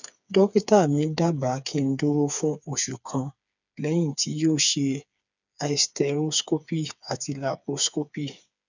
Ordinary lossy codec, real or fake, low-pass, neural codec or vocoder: none; fake; 7.2 kHz; codec, 16 kHz, 4 kbps, FreqCodec, smaller model